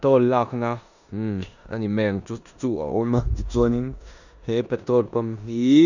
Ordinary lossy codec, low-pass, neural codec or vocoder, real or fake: none; 7.2 kHz; codec, 16 kHz in and 24 kHz out, 0.9 kbps, LongCat-Audio-Codec, four codebook decoder; fake